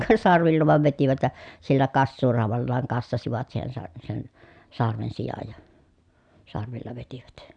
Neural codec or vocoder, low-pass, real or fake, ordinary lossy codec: none; 9.9 kHz; real; none